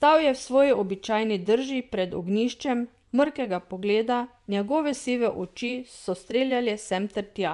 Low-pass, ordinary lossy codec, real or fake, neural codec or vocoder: 10.8 kHz; MP3, 96 kbps; fake; vocoder, 24 kHz, 100 mel bands, Vocos